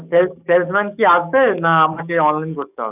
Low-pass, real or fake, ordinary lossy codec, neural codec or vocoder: 3.6 kHz; fake; none; codec, 44.1 kHz, 7.8 kbps, DAC